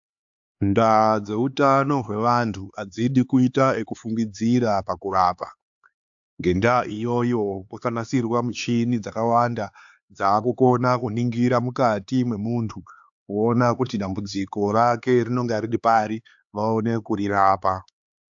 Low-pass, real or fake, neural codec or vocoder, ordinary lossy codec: 7.2 kHz; fake; codec, 16 kHz, 4 kbps, X-Codec, HuBERT features, trained on LibriSpeech; AAC, 64 kbps